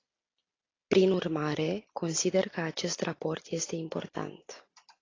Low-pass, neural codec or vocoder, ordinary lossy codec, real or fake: 7.2 kHz; none; AAC, 32 kbps; real